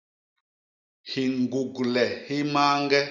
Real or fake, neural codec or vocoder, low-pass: real; none; 7.2 kHz